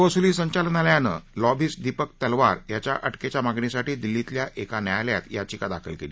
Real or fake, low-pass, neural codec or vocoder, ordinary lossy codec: real; none; none; none